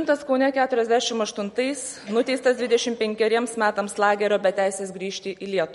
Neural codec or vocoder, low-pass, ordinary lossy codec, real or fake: none; 19.8 kHz; MP3, 48 kbps; real